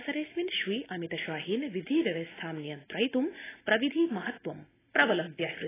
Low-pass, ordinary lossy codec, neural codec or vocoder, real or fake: 3.6 kHz; AAC, 16 kbps; none; real